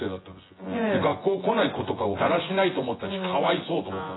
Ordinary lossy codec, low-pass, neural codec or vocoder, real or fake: AAC, 16 kbps; 7.2 kHz; vocoder, 24 kHz, 100 mel bands, Vocos; fake